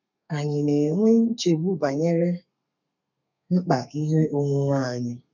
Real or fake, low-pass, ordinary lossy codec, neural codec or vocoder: fake; 7.2 kHz; none; codec, 32 kHz, 1.9 kbps, SNAC